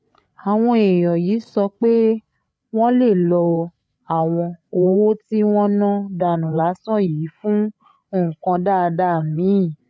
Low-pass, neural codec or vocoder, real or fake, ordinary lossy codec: none; codec, 16 kHz, 8 kbps, FreqCodec, larger model; fake; none